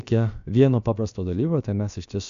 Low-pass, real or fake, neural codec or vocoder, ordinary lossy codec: 7.2 kHz; fake; codec, 16 kHz, about 1 kbps, DyCAST, with the encoder's durations; AAC, 96 kbps